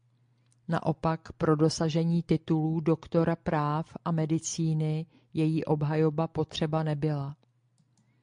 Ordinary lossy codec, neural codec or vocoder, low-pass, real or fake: MP3, 64 kbps; none; 9.9 kHz; real